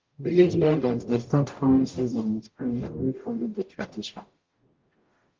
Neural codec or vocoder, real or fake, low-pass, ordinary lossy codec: codec, 44.1 kHz, 0.9 kbps, DAC; fake; 7.2 kHz; Opus, 32 kbps